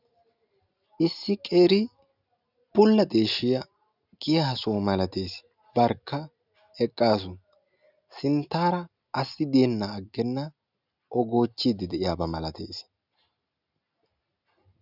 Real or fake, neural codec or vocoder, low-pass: real; none; 5.4 kHz